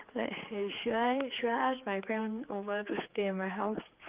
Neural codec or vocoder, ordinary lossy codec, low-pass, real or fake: codec, 16 kHz, 4 kbps, X-Codec, HuBERT features, trained on general audio; Opus, 24 kbps; 3.6 kHz; fake